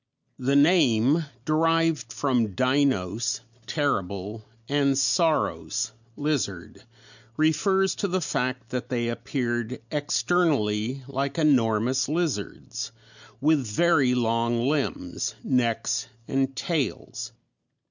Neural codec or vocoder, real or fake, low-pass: none; real; 7.2 kHz